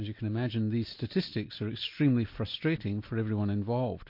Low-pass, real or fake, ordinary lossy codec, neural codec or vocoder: 5.4 kHz; real; MP3, 32 kbps; none